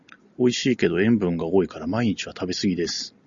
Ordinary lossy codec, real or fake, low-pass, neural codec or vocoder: Opus, 64 kbps; real; 7.2 kHz; none